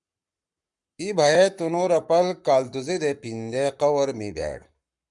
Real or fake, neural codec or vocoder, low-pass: fake; codec, 44.1 kHz, 7.8 kbps, Pupu-Codec; 10.8 kHz